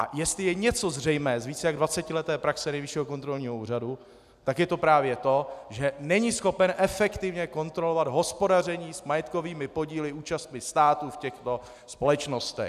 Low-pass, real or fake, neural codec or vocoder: 14.4 kHz; real; none